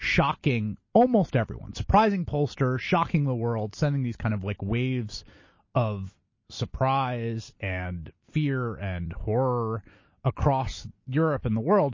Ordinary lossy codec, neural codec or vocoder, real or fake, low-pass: MP3, 32 kbps; none; real; 7.2 kHz